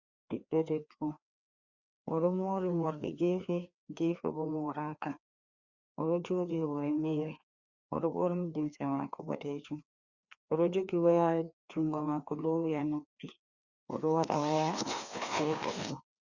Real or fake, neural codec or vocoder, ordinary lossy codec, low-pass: fake; codec, 16 kHz, 2 kbps, FreqCodec, larger model; Opus, 64 kbps; 7.2 kHz